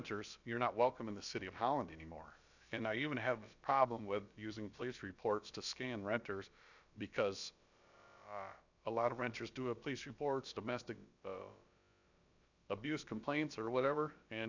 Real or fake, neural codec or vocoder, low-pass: fake; codec, 16 kHz, about 1 kbps, DyCAST, with the encoder's durations; 7.2 kHz